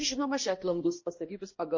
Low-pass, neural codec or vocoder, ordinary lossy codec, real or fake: 7.2 kHz; codec, 16 kHz, 1 kbps, X-Codec, HuBERT features, trained on balanced general audio; MP3, 32 kbps; fake